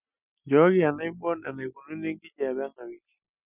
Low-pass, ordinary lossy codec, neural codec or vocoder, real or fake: 3.6 kHz; none; none; real